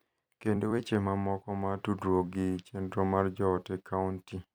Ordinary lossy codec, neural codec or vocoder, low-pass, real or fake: none; vocoder, 44.1 kHz, 128 mel bands every 256 samples, BigVGAN v2; none; fake